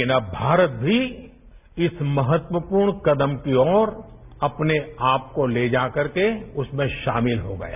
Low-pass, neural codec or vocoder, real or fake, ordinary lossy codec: 3.6 kHz; none; real; none